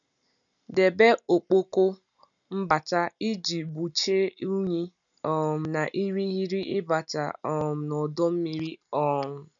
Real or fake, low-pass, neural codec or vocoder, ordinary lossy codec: real; 7.2 kHz; none; none